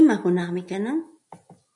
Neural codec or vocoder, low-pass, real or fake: none; 10.8 kHz; real